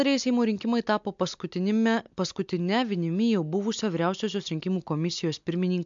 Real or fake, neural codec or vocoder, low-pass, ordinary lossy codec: real; none; 7.2 kHz; MP3, 64 kbps